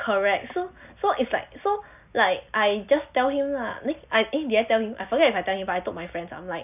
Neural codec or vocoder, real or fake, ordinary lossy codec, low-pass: none; real; none; 3.6 kHz